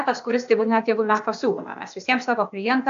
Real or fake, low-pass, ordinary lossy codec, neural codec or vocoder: fake; 7.2 kHz; AAC, 64 kbps; codec, 16 kHz, 0.8 kbps, ZipCodec